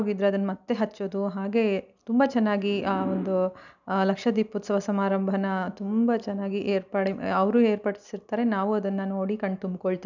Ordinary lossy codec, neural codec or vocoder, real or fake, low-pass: none; none; real; 7.2 kHz